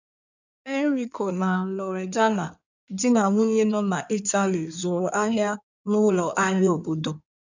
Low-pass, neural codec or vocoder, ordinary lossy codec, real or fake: 7.2 kHz; codec, 16 kHz in and 24 kHz out, 2.2 kbps, FireRedTTS-2 codec; none; fake